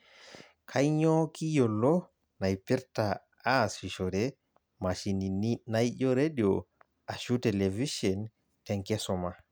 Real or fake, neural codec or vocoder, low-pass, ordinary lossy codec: real; none; none; none